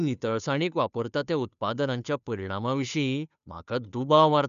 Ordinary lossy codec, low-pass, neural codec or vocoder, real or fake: none; 7.2 kHz; codec, 16 kHz, 2 kbps, FunCodec, trained on Chinese and English, 25 frames a second; fake